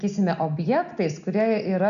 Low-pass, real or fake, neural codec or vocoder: 7.2 kHz; real; none